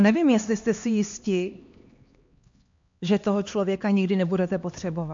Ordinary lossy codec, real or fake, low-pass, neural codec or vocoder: MP3, 48 kbps; fake; 7.2 kHz; codec, 16 kHz, 2 kbps, X-Codec, HuBERT features, trained on LibriSpeech